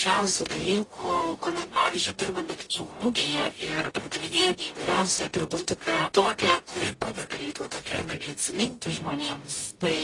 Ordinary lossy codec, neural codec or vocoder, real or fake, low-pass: AAC, 32 kbps; codec, 44.1 kHz, 0.9 kbps, DAC; fake; 10.8 kHz